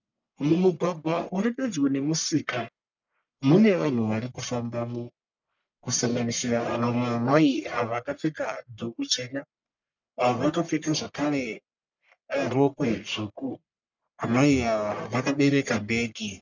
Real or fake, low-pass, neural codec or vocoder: fake; 7.2 kHz; codec, 44.1 kHz, 1.7 kbps, Pupu-Codec